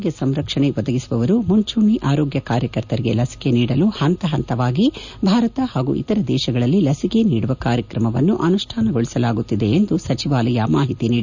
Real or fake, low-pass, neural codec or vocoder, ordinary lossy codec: real; 7.2 kHz; none; none